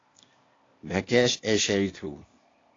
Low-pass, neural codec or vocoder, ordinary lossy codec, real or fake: 7.2 kHz; codec, 16 kHz, 0.8 kbps, ZipCodec; AAC, 32 kbps; fake